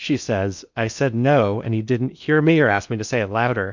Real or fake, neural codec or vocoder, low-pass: fake; codec, 16 kHz in and 24 kHz out, 0.6 kbps, FocalCodec, streaming, 2048 codes; 7.2 kHz